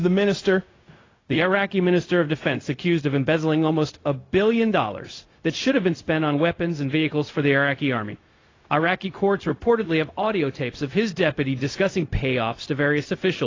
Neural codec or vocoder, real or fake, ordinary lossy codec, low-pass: codec, 16 kHz, 0.4 kbps, LongCat-Audio-Codec; fake; AAC, 32 kbps; 7.2 kHz